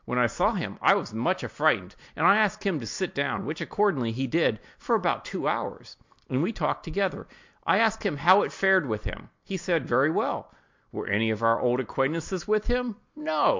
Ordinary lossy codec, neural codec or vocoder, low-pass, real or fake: MP3, 48 kbps; none; 7.2 kHz; real